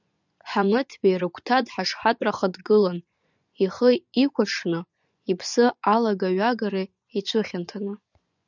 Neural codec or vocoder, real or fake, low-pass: vocoder, 24 kHz, 100 mel bands, Vocos; fake; 7.2 kHz